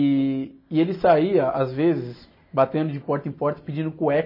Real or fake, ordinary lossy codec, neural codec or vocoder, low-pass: real; MP3, 24 kbps; none; 5.4 kHz